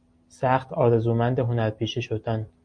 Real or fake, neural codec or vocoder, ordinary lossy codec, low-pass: real; none; MP3, 64 kbps; 9.9 kHz